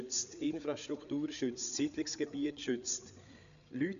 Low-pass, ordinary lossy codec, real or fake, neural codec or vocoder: 7.2 kHz; none; fake; codec, 16 kHz, 8 kbps, FreqCodec, larger model